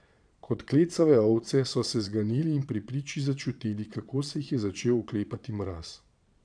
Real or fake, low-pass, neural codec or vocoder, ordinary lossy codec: fake; 9.9 kHz; vocoder, 22.05 kHz, 80 mel bands, Vocos; none